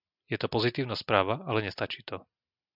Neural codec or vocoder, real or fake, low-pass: none; real; 5.4 kHz